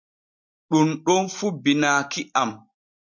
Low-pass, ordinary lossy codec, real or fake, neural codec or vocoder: 7.2 kHz; MP3, 48 kbps; real; none